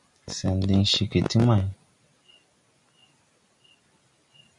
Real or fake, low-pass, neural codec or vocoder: real; 10.8 kHz; none